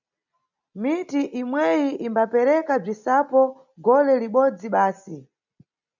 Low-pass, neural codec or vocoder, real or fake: 7.2 kHz; none; real